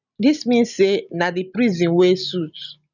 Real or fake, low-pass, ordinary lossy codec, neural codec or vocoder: real; 7.2 kHz; none; none